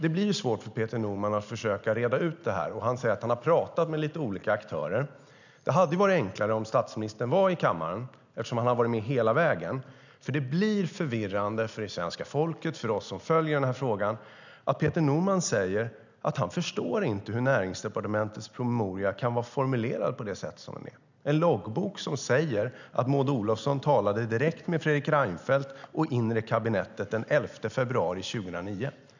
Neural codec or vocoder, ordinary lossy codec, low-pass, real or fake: none; none; 7.2 kHz; real